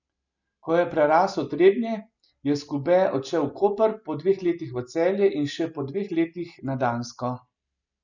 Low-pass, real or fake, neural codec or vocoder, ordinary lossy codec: 7.2 kHz; real; none; none